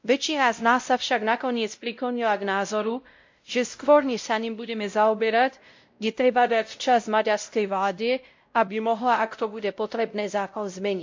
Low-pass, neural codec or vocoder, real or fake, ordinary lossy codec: 7.2 kHz; codec, 16 kHz, 0.5 kbps, X-Codec, WavLM features, trained on Multilingual LibriSpeech; fake; MP3, 48 kbps